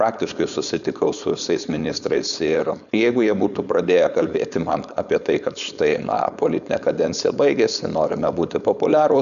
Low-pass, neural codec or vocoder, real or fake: 7.2 kHz; codec, 16 kHz, 4.8 kbps, FACodec; fake